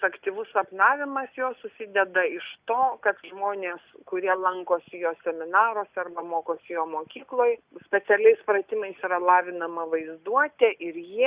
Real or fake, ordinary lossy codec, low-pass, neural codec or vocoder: real; Opus, 64 kbps; 3.6 kHz; none